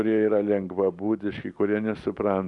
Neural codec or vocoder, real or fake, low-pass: none; real; 10.8 kHz